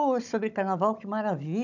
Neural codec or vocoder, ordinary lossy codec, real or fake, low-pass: codec, 16 kHz, 16 kbps, FunCodec, trained on Chinese and English, 50 frames a second; none; fake; 7.2 kHz